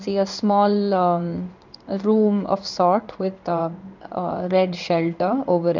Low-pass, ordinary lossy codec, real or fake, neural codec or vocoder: 7.2 kHz; none; fake; codec, 16 kHz in and 24 kHz out, 1 kbps, XY-Tokenizer